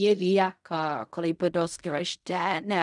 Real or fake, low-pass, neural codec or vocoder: fake; 10.8 kHz; codec, 16 kHz in and 24 kHz out, 0.4 kbps, LongCat-Audio-Codec, fine tuned four codebook decoder